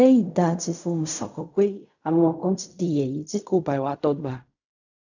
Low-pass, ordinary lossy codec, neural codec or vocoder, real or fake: 7.2 kHz; none; codec, 16 kHz in and 24 kHz out, 0.4 kbps, LongCat-Audio-Codec, fine tuned four codebook decoder; fake